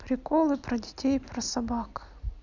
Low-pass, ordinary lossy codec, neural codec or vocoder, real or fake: 7.2 kHz; none; none; real